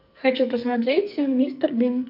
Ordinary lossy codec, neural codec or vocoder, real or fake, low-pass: none; codec, 44.1 kHz, 2.6 kbps, SNAC; fake; 5.4 kHz